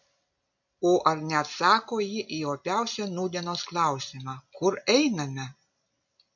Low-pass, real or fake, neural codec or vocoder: 7.2 kHz; real; none